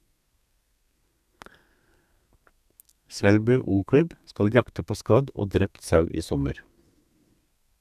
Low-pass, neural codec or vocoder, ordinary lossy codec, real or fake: 14.4 kHz; codec, 32 kHz, 1.9 kbps, SNAC; none; fake